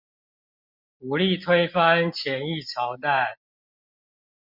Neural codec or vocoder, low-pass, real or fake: none; 5.4 kHz; real